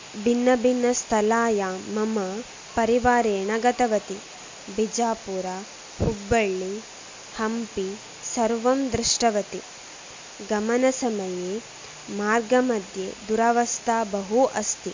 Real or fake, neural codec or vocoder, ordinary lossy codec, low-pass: real; none; MP3, 64 kbps; 7.2 kHz